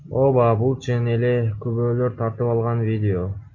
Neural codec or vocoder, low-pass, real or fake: none; 7.2 kHz; real